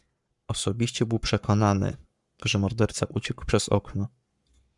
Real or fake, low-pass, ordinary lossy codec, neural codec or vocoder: fake; 10.8 kHz; MP3, 96 kbps; codec, 44.1 kHz, 7.8 kbps, Pupu-Codec